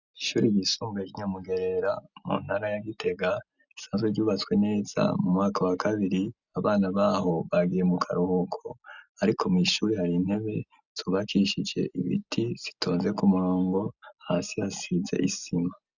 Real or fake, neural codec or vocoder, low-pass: real; none; 7.2 kHz